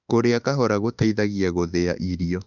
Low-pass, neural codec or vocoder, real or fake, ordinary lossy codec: 7.2 kHz; autoencoder, 48 kHz, 32 numbers a frame, DAC-VAE, trained on Japanese speech; fake; none